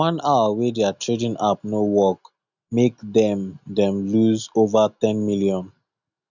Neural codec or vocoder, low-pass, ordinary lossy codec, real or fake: none; 7.2 kHz; none; real